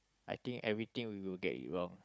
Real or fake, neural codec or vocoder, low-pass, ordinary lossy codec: fake; codec, 16 kHz, 16 kbps, FunCodec, trained on Chinese and English, 50 frames a second; none; none